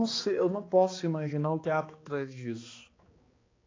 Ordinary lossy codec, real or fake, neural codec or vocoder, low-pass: AAC, 32 kbps; fake; codec, 16 kHz, 2 kbps, X-Codec, HuBERT features, trained on balanced general audio; 7.2 kHz